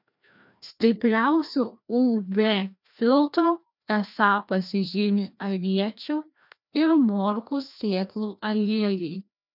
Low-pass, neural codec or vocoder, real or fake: 5.4 kHz; codec, 16 kHz, 1 kbps, FreqCodec, larger model; fake